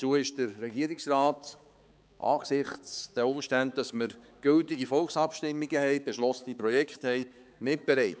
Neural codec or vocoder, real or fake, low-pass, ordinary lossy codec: codec, 16 kHz, 4 kbps, X-Codec, HuBERT features, trained on balanced general audio; fake; none; none